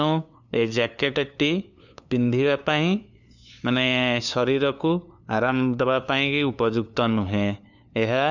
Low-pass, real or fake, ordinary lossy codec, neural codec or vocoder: 7.2 kHz; fake; none; codec, 16 kHz, 2 kbps, FunCodec, trained on LibriTTS, 25 frames a second